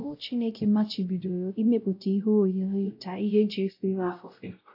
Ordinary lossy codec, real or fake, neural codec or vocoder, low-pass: AAC, 32 kbps; fake; codec, 16 kHz, 0.5 kbps, X-Codec, WavLM features, trained on Multilingual LibriSpeech; 5.4 kHz